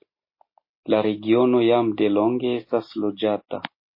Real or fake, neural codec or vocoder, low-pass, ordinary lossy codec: real; none; 5.4 kHz; MP3, 24 kbps